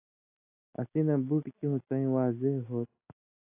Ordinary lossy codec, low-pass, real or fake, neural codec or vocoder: AAC, 24 kbps; 3.6 kHz; real; none